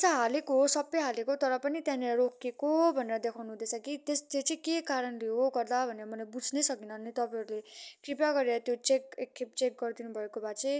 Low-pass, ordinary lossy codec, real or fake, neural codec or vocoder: none; none; real; none